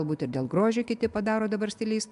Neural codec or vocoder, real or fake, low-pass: none; real; 10.8 kHz